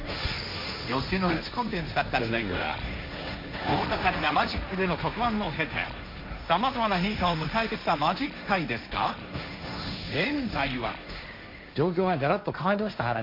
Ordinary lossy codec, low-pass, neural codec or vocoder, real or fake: MP3, 48 kbps; 5.4 kHz; codec, 16 kHz, 1.1 kbps, Voila-Tokenizer; fake